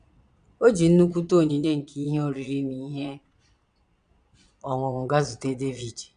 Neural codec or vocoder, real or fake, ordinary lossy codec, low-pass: vocoder, 22.05 kHz, 80 mel bands, Vocos; fake; none; 9.9 kHz